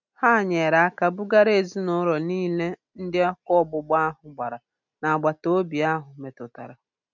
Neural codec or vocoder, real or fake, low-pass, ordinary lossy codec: none; real; 7.2 kHz; none